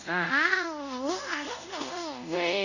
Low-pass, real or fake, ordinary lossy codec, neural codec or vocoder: 7.2 kHz; fake; none; codec, 24 kHz, 0.5 kbps, DualCodec